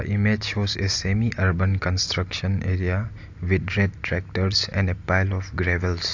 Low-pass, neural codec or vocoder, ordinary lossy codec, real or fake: 7.2 kHz; none; AAC, 48 kbps; real